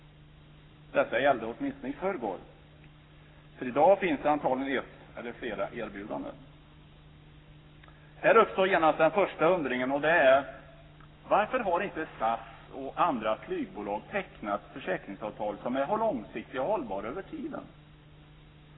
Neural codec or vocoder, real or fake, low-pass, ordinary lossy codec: codec, 44.1 kHz, 7.8 kbps, Pupu-Codec; fake; 7.2 kHz; AAC, 16 kbps